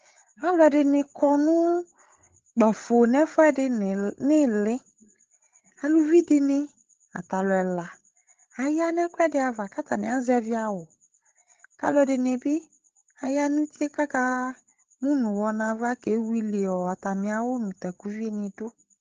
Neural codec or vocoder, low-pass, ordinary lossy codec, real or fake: codec, 16 kHz, 8 kbps, FreqCodec, larger model; 7.2 kHz; Opus, 16 kbps; fake